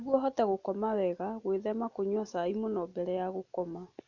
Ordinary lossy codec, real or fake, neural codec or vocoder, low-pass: none; real; none; 7.2 kHz